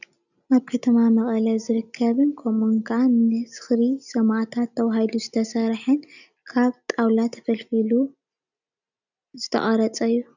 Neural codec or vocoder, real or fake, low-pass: none; real; 7.2 kHz